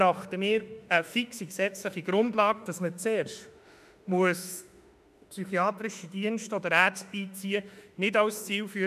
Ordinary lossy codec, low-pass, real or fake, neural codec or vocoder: none; 14.4 kHz; fake; autoencoder, 48 kHz, 32 numbers a frame, DAC-VAE, trained on Japanese speech